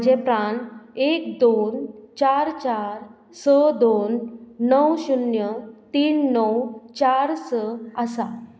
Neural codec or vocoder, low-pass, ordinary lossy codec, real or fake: none; none; none; real